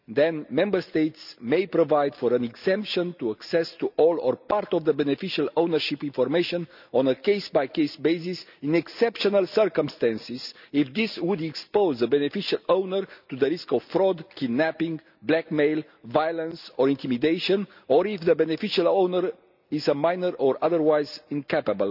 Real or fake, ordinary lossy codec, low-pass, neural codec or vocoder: real; none; 5.4 kHz; none